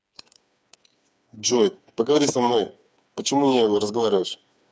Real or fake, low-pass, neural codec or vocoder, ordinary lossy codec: fake; none; codec, 16 kHz, 4 kbps, FreqCodec, smaller model; none